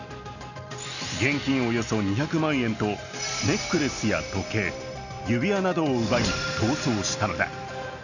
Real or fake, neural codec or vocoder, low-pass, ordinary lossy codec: real; none; 7.2 kHz; none